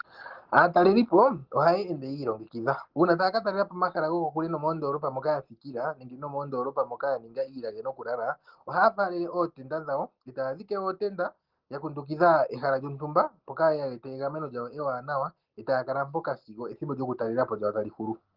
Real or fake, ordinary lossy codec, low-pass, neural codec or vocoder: real; Opus, 16 kbps; 5.4 kHz; none